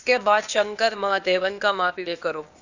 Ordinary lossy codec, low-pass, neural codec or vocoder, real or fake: Opus, 64 kbps; 7.2 kHz; codec, 16 kHz, 0.8 kbps, ZipCodec; fake